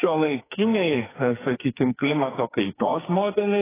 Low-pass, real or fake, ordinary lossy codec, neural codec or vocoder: 3.6 kHz; fake; AAC, 16 kbps; codec, 44.1 kHz, 2.6 kbps, SNAC